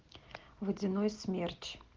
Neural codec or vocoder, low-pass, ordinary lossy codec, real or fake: none; 7.2 kHz; Opus, 16 kbps; real